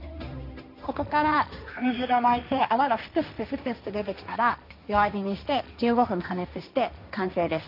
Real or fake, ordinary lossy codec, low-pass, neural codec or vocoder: fake; none; 5.4 kHz; codec, 16 kHz, 1.1 kbps, Voila-Tokenizer